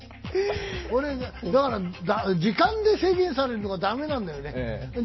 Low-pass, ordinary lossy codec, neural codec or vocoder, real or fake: 7.2 kHz; MP3, 24 kbps; none; real